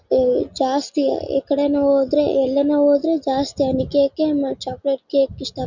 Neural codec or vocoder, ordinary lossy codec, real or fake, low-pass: none; none; real; 7.2 kHz